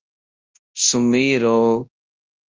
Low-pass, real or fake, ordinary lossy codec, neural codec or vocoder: 7.2 kHz; fake; Opus, 24 kbps; codec, 24 kHz, 0.9 kbps, WavTokenizer, large speech release